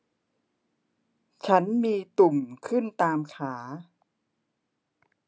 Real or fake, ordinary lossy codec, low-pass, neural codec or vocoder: real; none; none; none